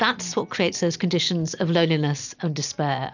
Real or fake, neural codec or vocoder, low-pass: real; none; 7.2 kHz